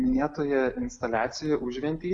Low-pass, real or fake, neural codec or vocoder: 10.8 kHz; real; none